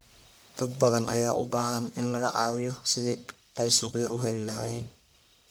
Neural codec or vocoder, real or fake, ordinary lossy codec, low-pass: codec, 44.1 kHz, 1.7 kbps, Pupu-Codec; fake; none; none